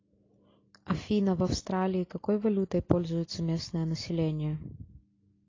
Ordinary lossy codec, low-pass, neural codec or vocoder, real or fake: AAC, 32 kbps; 7.2 kHz; none; real